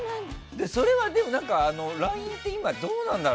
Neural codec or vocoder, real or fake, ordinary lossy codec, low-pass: none; real; none; none